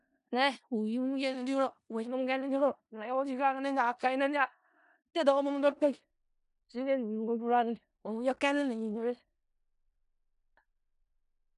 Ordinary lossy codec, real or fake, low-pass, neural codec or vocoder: none; fake; 10.8 kHz; codec, 16 kHz in and 24 kHz out, 0.4 kbps, LongCat-Audio-Codec, four codebook decoder